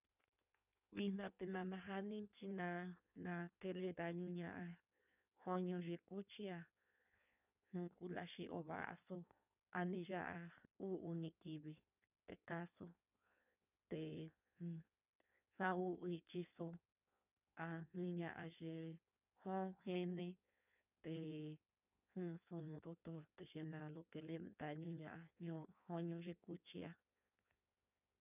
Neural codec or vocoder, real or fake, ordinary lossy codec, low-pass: codec, 16 kHz in and 24 kHz out, 1.1 kbps, FireRedTTS-2 codec; fake; none; 3.6 kHz